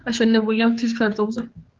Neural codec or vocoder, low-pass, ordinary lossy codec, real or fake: codec, 16 kHz, 4 kbps, X-Codec, HuBERT features, trained on general audio; 7.2 kHz; Opus, 16 kbps; fake